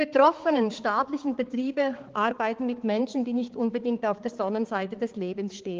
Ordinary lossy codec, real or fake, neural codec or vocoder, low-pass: Opus, 16 kbps; fake; codec, 16 kHz, 4 kbps, X-Codec, HuBERT features, trained on balanced general audio; 7.2 kHz